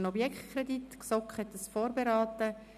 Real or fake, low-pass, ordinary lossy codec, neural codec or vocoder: real; 14.4 kHz; none; none